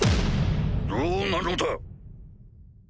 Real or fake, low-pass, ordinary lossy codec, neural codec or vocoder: real; none; none; none